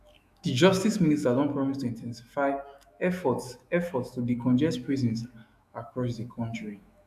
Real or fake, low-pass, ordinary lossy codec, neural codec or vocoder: fake; 14.4 kHz; AAC, 96 kbps; autoencoder, 48 kHz, 128 numbers a frame, DAC-VAE, trained on Japanese speech